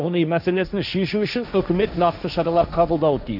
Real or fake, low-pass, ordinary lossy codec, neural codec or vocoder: fake; 5.4 kHz; none; codec, 16 kHz, 1.1 kbps, Voila-Tokenizer